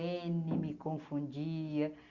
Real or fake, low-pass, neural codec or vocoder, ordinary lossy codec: real; 7.2 kHz; none; Opus, 64 kbps